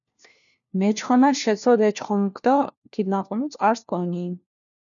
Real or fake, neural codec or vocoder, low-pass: fake; codec, 16 kHz, 1 kbps, FunCodec, trained on LibriTTS, 50 frames a second; 7.2 kHz